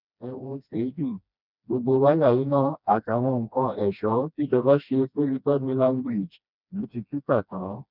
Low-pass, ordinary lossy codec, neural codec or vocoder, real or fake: 5.4 kHz; none; codec, 16 kHz, 1 kbps, FreqCodec, smaller model; fake